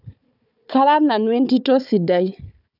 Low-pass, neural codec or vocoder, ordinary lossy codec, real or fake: 5.4 kHz; codec, 16 kHz, 4 kbps, FunCodec, trained on Chinese and English, 50 frames a second; none; fake